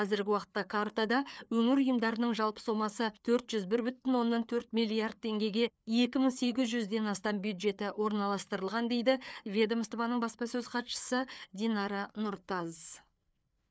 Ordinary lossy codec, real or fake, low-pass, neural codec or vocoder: none; fake; none; codec, 16 kHz, 4 kbps, FreqCodec, larger model